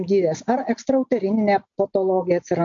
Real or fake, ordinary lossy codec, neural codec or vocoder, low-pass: real; MP3, 48 kbps; none; 7.2 kHz